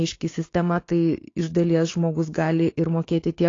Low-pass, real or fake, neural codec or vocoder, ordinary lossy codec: 7.2 kHz; fake; codec, 16 kHz, 6 kbps, DAC; AAC, 32 kbps